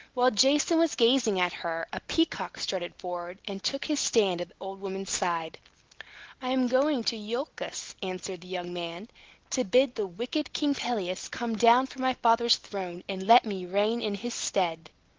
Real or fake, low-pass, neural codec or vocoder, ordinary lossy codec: real; 7.2 kHz; none; Opus, 16 kbps